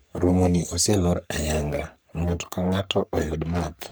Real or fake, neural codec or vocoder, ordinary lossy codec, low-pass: fake; codec, 44.1 kHz, 3.4 kbps, Pupu-Codec; none; none